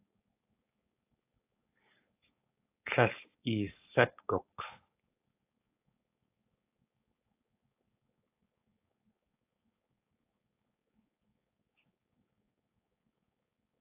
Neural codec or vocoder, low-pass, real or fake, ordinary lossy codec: codec, 16 kHz, 4.8 kbps, FACodec; 3.6 kHz; fake; AAC, 24 kbps